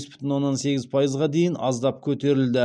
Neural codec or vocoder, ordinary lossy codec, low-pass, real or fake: none; none; 9.9 kHz; real